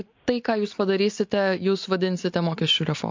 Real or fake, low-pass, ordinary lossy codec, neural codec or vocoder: real; 7.2 kHz; MP3, 48 kbps; none